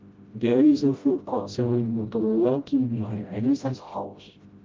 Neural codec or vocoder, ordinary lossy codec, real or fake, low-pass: codec, 16 kHz, 0.5 kbps, FreqCodec, smaller model; Opus, 24 kbps; fake; 7.2 kHz